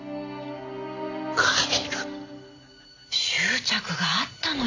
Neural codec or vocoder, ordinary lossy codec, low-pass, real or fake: none; AAC, 48 kbps; 7.2 kHz; real